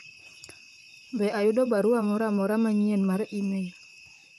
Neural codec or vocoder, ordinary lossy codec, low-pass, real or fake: vocoder, 44.1 kHz, 128 mel bands, Pupu-Vocoder; none; 14.4 kHz; fake